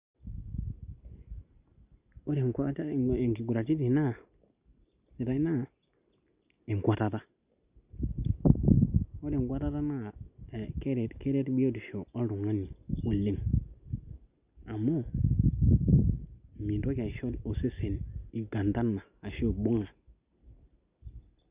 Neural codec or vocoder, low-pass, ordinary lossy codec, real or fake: none; 3.6 kHz; Opus, 64 kbps; real